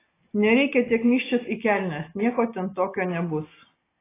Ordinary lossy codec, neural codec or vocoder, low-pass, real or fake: AAC, 16 kbps; none; 3.6 kHz; real